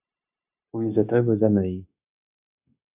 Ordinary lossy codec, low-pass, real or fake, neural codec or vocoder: AAC, 32 kbps; 3.6 kHz; fake; codec, 16 kHz, 0.9 kbps, LongCat-Audio-Codec